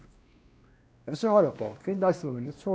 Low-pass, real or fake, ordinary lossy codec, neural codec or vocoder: none; fake; none; codec, 16 kHz, 1 kbps, X-Codec, WavLM features, trained on Multilingual LibriSpeech